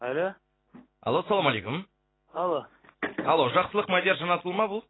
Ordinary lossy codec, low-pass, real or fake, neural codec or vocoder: AAC, 16 kbps; 7.2 kHz; real; none